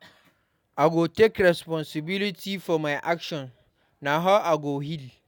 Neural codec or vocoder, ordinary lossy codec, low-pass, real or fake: none; none; 19.8 kHz; real